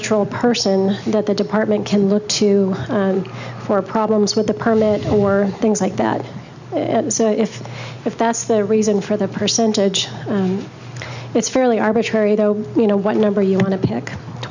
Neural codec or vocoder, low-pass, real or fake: none; 7.2 kHz; real